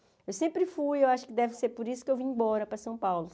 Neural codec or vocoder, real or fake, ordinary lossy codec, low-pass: none; real; none; none